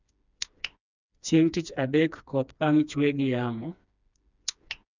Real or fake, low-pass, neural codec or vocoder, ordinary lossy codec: fake; 7.2 kHz; codec, 16 kHz, 2 kbps, FreqCodec, smaller model; none